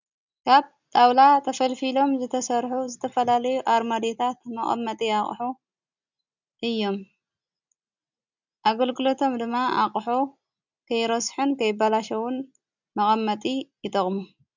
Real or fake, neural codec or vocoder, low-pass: real; none; 7.2 kHz